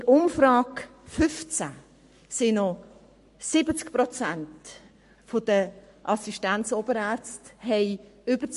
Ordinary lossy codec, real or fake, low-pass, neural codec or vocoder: MP3, 48 kbps; fake; 14.4 kHz; codec, 44.1 kHz, 7.8 kbps, Pupu-Codec